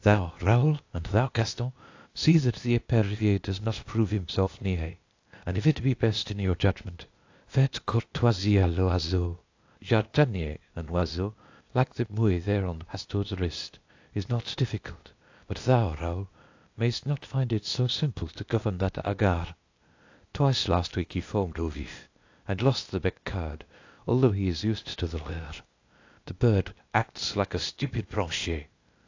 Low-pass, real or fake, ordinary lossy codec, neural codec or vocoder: 7.2 kHz; fake; AAC, 48 kbps; codec, 16 kHz, 0.8 kbps, ZipCodec